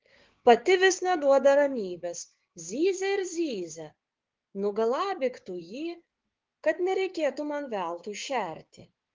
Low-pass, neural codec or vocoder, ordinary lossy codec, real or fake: 7.2 kHz; codec, 16 kHz, 6 kbps, DAC; Opus, 16 kbps; fake